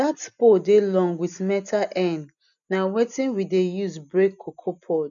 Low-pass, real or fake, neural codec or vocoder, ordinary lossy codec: 7.2 kHz; real; none; none